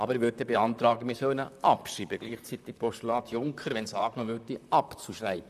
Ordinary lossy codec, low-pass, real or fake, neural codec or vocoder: none; 14.4 kHz; fake; vocoder, 44.1 kHz, 128 mel bands, Pupu-Vocoder